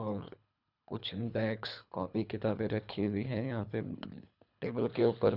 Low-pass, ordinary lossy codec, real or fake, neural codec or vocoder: 5.4 kHz; none; fake; codec, 24 kHz, 3 kbps, HILCodec